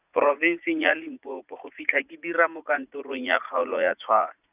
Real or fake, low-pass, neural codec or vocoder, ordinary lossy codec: fake; 3.6 kHz; vocoder, 44.1 kHz, 80 mel bands, Vocos; none